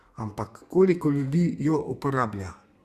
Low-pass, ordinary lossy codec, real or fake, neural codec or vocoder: 14.4 kHz; Opus, 64 kbps; fake; codec, 32 kHz, 1.9 kbps, SNAC